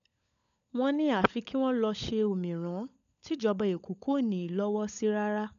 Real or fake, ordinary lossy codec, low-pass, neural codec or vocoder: fake; none; 7.2 kHz; codec, 16 kHz, 16 kbps, FunCodec, trained on LibriTTS, 50 frames a second